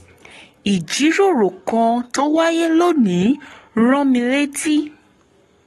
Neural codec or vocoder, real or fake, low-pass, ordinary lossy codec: codec, 44.1 kHz, 7.8 kbps, Pupu-Codec; fake; 19.8 kHz; AAC, 32 kbps